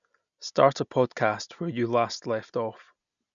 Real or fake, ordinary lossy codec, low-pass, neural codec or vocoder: real; none; 7.2 kHz; none